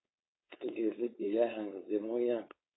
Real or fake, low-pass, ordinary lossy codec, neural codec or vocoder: fake; 7.2 kHz; AAC, 16 kbps; codec, 16 kHz, 4.8 kbps, FACodec